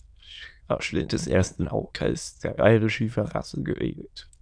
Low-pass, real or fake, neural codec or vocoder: 9.9 kHz; fake; autoencoder, 22.05 kHz, a latent of 192 numbers a frame, VITS, trained on many speakers